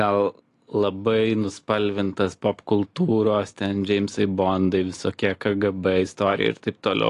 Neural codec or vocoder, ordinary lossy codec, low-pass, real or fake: none; AAC, 48 kbps; 10.8 kHz; real